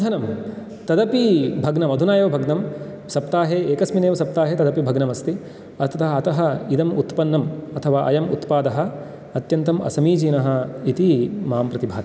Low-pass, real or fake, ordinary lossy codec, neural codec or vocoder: none; real; none; none